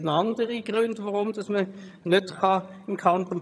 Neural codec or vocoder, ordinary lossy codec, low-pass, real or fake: vocoder, 22.05 kHz, 80 mel bands, HiFi-GAN; none; none; fake